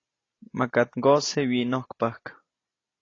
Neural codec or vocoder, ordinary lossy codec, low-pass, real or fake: none; AAC, 32 kbps; 7.2 kHz; real